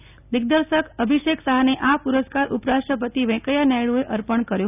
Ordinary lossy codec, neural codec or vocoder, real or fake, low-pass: none; none; real; 3.6 kHz